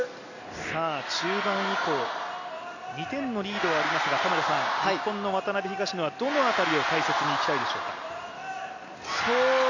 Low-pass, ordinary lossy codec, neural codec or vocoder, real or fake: 7.2 kHz; none; none; real